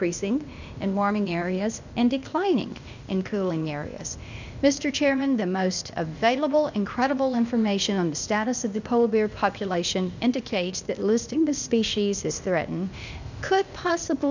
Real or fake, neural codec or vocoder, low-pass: fake; codec, 16 kHz, 0.8 kbps, ZipCodec; 7.2 kHz